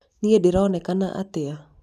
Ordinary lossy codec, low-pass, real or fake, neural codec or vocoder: none; 14.4 kHz; real; none